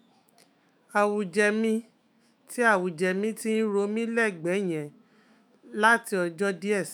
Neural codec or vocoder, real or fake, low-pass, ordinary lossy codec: autoencoder, 48 kHz, 128 numbers a frame, DAC-VAE, trained on Japanese speech; fake; none; none